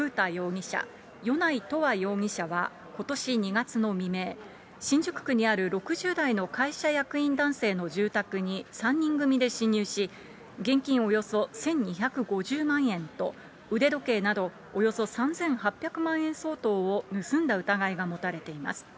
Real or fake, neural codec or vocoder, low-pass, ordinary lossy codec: real; none; none; none